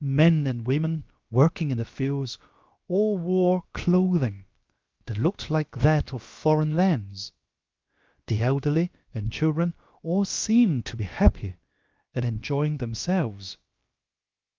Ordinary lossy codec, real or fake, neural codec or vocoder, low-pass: Opus, 32 kbps; fake; codec, 16 kHz, about 1 kbps, DyCAST, with the encoder's durations; 7.2 kHz